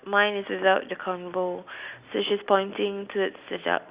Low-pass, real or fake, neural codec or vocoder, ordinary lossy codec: 3.6 kHz; real; none; Opus, 64 kbps